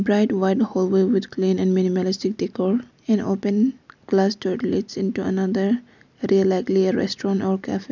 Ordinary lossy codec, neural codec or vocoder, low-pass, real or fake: none; none; 7.2 kHz; real